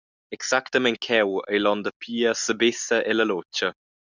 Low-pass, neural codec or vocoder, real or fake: 7.2 kHz; none; real